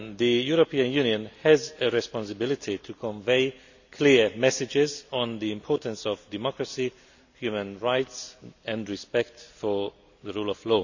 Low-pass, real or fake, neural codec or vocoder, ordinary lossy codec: 7.2 kHz; real; none; none